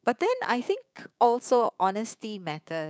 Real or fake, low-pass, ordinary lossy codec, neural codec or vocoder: fake; none; none; codec, 16 kHz, 6 kbps, DAC